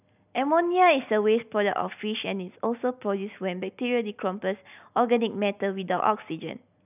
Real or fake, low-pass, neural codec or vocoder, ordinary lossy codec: real; 3.6 kHz; none; none